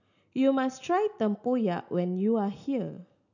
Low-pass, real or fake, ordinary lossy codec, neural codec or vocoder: 7.2 kHz; real; none; none